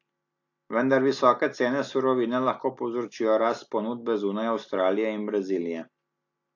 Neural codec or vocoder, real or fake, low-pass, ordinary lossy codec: none; real; 7.2 kHz; AAC, 48 kbps